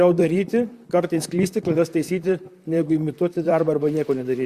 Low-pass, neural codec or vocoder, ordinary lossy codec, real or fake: 14.4 kHz; vocoder, 44.1 kHz, 128 mel bands, Pupu-Vocoder; Opus, 64 kbps; fake